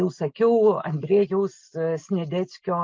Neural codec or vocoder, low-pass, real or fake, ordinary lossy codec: none; 7.2 kHz; real; Opus, 32 kbps